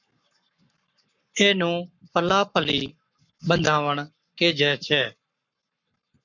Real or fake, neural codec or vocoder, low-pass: fake; codec, 44.1 kHz, 7.8 kbps, Pupu-Codec; 7.2 kHz